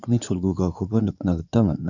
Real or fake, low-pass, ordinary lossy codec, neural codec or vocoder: fake; 7.2 kHz; none; codec, 16 kHz in and 24 kHz out, 2.2 kbps, FireRedTTS-2 codec